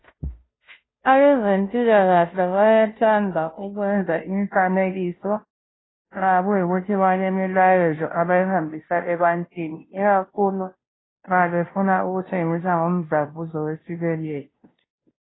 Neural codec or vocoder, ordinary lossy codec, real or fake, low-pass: codec, 16 kHz, 0.5 kbps, FunCodec, trained on Chinese and English, 25 frames a second; AAC, 16 kbps; fake; 7.2 kHz